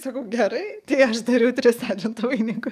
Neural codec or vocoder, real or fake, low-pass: codec, 44.1 kHz, 7.8 kbps, DAC; fake; 14.4 kHz